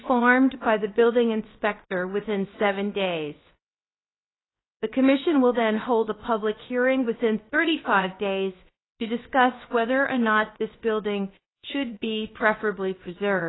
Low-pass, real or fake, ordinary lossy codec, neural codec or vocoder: 7.2 kHz; fake; AAC, 16 kbps; codec, 16 kHz, about 1 kbps, DyCAST, with the encoder's durations